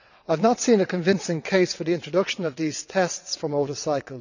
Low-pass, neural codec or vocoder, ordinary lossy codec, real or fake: 7.2 kHz; vocoder, 22.05 kHz, 80 mel bands, WaveNeXt; none; fake